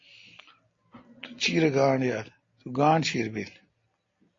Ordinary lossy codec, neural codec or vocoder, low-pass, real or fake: AAC, 32 kbps; none; 7.2 kHz; real